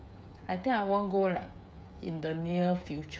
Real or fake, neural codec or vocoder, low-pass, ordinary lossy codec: fake; codec, 16 kHz, 8 kbps, FreqCodec, smaller model; none; none